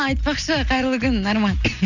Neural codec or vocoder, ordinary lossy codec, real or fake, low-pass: none; none; real; 7.2 kHz